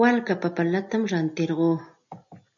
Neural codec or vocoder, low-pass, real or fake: none; 7.2 kHz; real